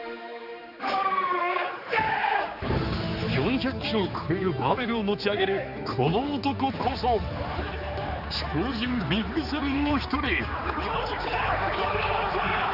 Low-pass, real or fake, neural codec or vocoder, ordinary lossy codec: 5.4 kHz; fake; codec, 16 kHz, 4 kbps, X-Codec, HuBERT features, trained on general audio; none